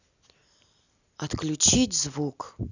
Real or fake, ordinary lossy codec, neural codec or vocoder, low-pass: real; none; none; 7.2 kHz